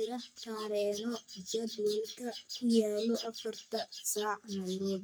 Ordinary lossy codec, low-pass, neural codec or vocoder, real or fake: none; none; codec, 44.1 kHz, 3.4 kbps, Pupu-Codec; fake